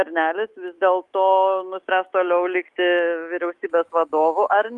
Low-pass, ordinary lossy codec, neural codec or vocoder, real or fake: 10.8 kHz; Opus, 24 kbps; autoencoder, 48 kHz, 128 numbers a frame, DAC-VAE, trained on Japanese speech; fake